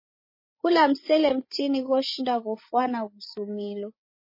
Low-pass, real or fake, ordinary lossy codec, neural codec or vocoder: 5.4 kHz; fake; MP3, 24 kbps; codec, 16 kHz, 8 kbps, FreqCodec, larger model